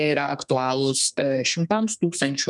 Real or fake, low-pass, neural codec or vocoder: fake; 10.8 kHz; codec, 32 kHz, 1.9 kbps, SNAC